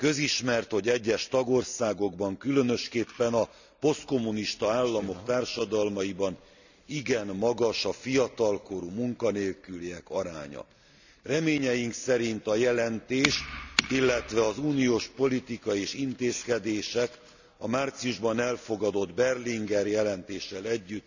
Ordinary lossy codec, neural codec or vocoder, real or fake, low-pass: none; none; real; 7.2 kHz